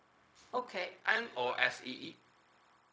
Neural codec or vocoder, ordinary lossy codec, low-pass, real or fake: codec, 16 kHz, 0.4 kbps, LongCat-Audio-Codec; none; none; fake